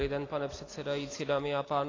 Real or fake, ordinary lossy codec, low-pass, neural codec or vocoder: real; AAC, 32 kbps; 7.2 kHz; none